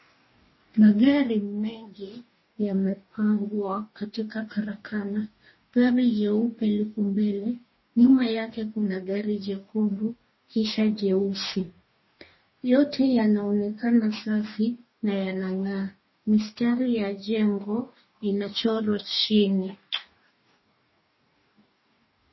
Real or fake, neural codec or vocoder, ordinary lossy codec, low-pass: fake; codec, 44.1 kHz, 2.6 kbps, DAC; MP3, 24 kbps; 7.2 kHz